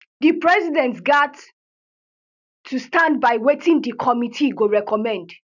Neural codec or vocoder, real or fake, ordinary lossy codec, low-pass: none; real; none; 7.2 kHz